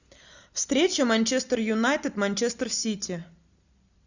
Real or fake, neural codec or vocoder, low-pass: real; none; 7.2 kHz